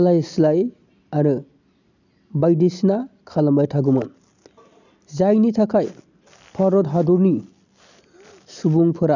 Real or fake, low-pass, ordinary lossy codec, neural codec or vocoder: real; 7.2 kHz; none; none